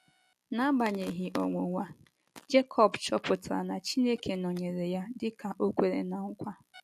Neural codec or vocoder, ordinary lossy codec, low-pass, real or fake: none; MP3, 64 kbps; 14.4 kHz; real